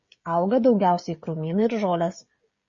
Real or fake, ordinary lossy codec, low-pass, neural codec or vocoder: fake; MP3, 32 kbps; 7.2 kHz; codec, 16 kHz, 16 kbps, FreqCodec, smaller model